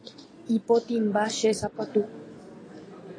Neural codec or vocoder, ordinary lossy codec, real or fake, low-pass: none; AAC, 32 kbps; real; 9.9 kHz